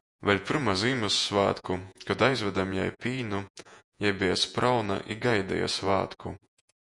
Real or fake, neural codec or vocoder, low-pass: fake; vocoder, 48 kHz, 128 mel bands, Vocos; 10.8 kHz